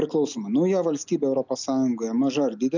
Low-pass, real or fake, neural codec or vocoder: 7.2 kHz; real; none